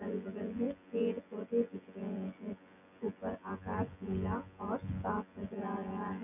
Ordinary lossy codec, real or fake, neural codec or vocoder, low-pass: none; fake; vocoder, 24 kHz, 100 mel bands, Vocos; 3.6 kHz